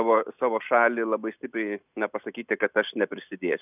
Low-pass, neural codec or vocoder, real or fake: 3.6 kHz; none; real